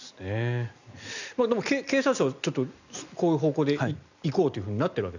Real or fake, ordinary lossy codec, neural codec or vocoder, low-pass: real; none; none; 7.2 kHz